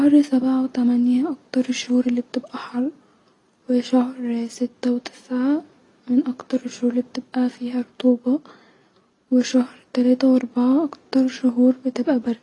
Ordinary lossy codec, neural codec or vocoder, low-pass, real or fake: AAC, 32 kbps; none; 10.8 kHz; real